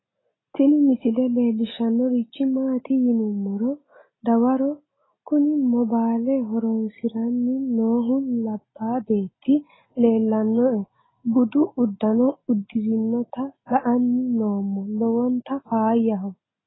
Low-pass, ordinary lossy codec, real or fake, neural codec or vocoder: 7.2 kHz; AAC, 16 kbps; real; none